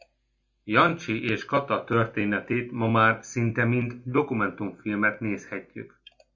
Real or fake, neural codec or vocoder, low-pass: real; none; 7.2 kHz